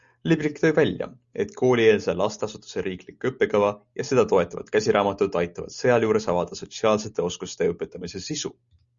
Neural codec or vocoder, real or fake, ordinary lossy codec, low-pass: none; real; Opus, 64 kbps; 7.2 kHz